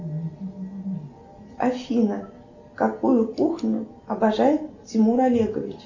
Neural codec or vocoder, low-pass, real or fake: none; 7.2 kHz; real